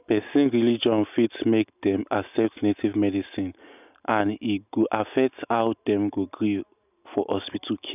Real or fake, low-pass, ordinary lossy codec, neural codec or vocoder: real; 3.6 kHz; none; none